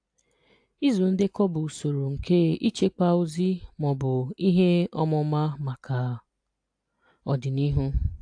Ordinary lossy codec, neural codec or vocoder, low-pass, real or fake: AAC, 64 kbps; none; 9.9 kHz; real